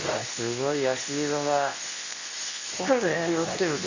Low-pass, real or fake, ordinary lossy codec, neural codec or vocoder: 7.2 kHz; fake; none; codec, 24 kHz, 0.9 kbps, WavTokenizer, medium speech release version 2